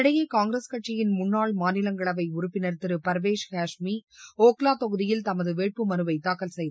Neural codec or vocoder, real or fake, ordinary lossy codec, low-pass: none; real; none; none